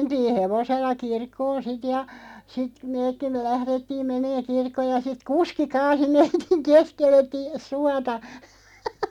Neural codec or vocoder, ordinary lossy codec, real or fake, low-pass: none; none; real; 19.8 kHz